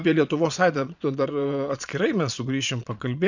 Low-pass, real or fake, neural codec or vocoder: 7.2 kHz; fake; vocoder, 22.05 kHz, 80 mel bands, Vocos